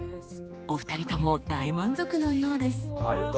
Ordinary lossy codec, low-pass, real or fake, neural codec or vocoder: none; none; fake; codec, 16 kHz, 2 kbps, X-Codec, HuBERT features, trained on general audio